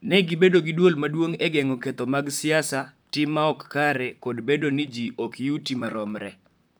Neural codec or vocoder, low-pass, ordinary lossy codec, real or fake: vocoder, 44.1 kHz, 128 mel bands, Pupu-Vocoder; none; none; fake